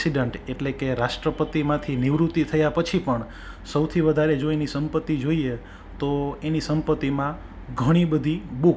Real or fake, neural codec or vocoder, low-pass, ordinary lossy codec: real; none; none; none